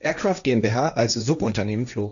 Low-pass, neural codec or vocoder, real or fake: 7.2 kHz; codec, 16 kHz, 1.1 kbps, Voila-Tokenizer; fake